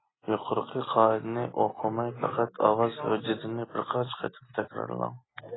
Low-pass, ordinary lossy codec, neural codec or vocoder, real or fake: 7.2 kHz; AAC, 16 kbps; none; real